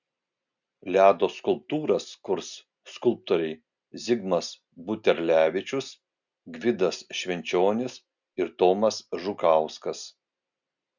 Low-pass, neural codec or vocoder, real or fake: 7.2 kHz; none; real